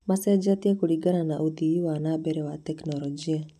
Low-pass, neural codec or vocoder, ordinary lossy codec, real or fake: 14.4 kHz; none; none; real